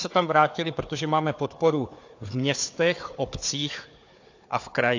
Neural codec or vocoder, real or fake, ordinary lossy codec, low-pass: codec, 16 kHz, 4 kbps, FunCodec, trained on Chinese and English, 50 frames a second; fake; AAC, 48 kbps; 7.2 kHz